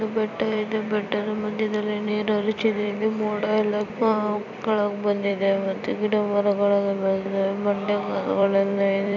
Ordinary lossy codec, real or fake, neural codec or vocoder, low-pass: none; fake; vocoder, 44.1 kHz, 128 mel bands every 256 samples, BigVGAN v2; 7.2 kHz